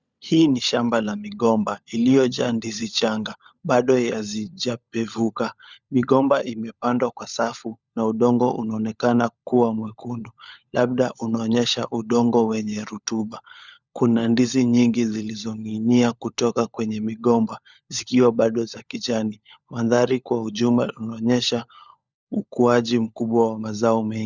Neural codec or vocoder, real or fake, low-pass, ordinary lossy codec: codec, 16 kHz, 16 kbps, FunCodec, trained on LibriTTS, 50 frames a second; fake; 7.2 kHz; Opus, 64 kbps